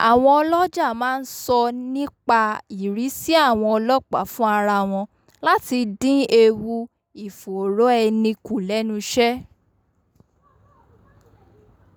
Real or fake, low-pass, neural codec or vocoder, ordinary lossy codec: real; none; none; none